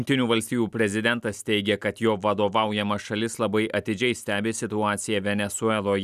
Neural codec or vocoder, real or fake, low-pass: none; real; 14.4 kHz